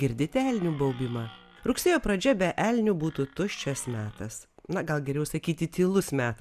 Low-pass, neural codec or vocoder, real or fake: 14.4 kHz; none; real